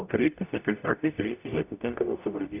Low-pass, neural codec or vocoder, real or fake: 3.6 kHz; codec, 44.1 kHz, 0.9 kbps, DAC; fake